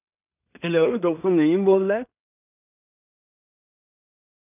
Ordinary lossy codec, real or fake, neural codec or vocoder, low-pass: none; fake; codec, 16 kHz in and 24 kHz out, 0.4 kbps, LongCat-Audio-Codec, two codebook decoder; 3.6 kHz